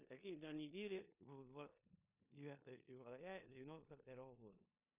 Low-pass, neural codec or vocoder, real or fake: 3.6 kHz; codec, 16 kHz in and 24 kHz out, 0.9 kbps, LongCat-Audio-Codec, four codebook decoder; fake